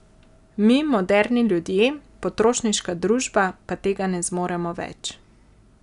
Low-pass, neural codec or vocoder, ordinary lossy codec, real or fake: 10.8 kHz; none; none; real